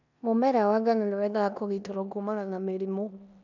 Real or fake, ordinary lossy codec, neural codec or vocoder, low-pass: fake; none; codec, 16 kHz in and 24 kHz out, 0.9 kbps, LongCat-Audio-Codec, four codebook decoder; 7.2 kHz